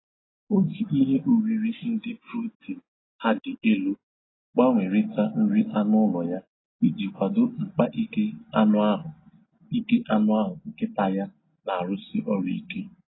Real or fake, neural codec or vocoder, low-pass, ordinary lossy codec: real; none; 7.2 kHz; AAC, 16 kbps